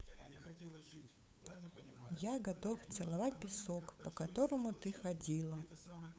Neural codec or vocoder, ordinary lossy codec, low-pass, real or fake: codec, 16 kHz, 8 kbps, FunCodec, trained on LibriTTS, 25 frames a second; none; none; fake